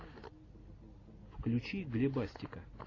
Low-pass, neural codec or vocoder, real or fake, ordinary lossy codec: 7.2 kHz; none; real; AAC, 32 kbps